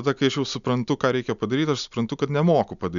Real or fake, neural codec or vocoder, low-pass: real; none; 7.2 kHz